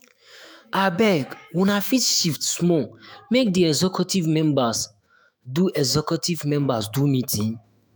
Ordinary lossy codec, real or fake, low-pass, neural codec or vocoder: none; fake; none; autoencoder, 48 kHz, 128 numbers a frame, DAC-VAE, trained on Japanese speech